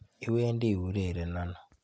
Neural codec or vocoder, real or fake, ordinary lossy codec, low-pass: none; real; none; none